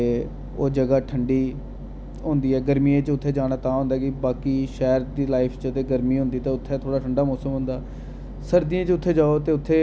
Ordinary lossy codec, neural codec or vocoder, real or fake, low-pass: none; none; real; none